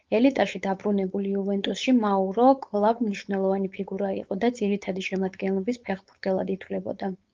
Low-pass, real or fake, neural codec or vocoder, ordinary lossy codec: 7.2 kHz; fake; codec, 16 kHz, 4.8 kbps, FACodec; Opus, 24 kbps